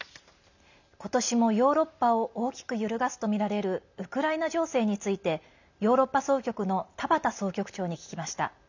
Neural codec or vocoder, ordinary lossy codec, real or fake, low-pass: none; none; real; 7.2 kHz